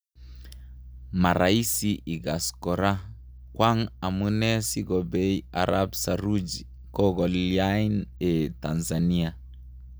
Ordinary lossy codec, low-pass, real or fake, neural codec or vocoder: none; none; real; none